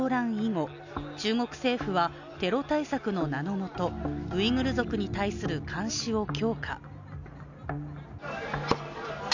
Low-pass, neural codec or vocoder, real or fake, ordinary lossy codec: 7.2 kHz; none; real; none